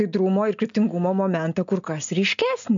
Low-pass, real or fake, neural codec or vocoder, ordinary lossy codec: 7.2 kHz; real; none; MP3, 64 kbps